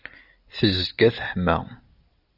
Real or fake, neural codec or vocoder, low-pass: real; none; 5.4 kHz